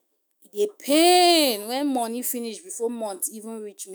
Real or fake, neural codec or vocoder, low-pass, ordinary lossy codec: fake; autoencoder, 48 kHz, 128 numbers a frame, DAC-VAE, trained on Japanese speech; none; none